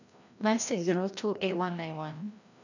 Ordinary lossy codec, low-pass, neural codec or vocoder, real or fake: none; 7.2 kHz; codec, 16 kHz, 1 kbps, FreqCodec, larger model; fake